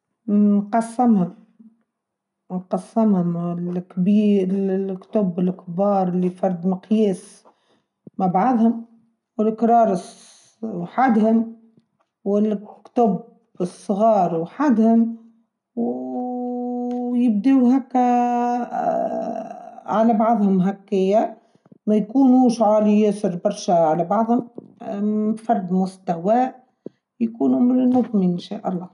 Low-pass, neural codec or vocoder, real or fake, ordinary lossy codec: 10.8 kHz; none; real; none